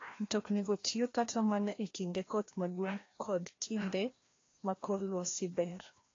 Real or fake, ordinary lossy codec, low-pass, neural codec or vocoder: fake; AAC, 32 kbps; 7.2 kHz; codec, 16 kHz, 1 kbps, FreqCodec, larger model